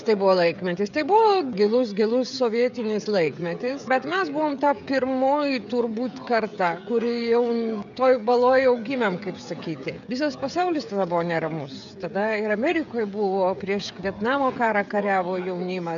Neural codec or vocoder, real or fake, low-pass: codec, 16 kHz, 16 kbps, FreqCodec, smaller model; fake; 7.2 kHz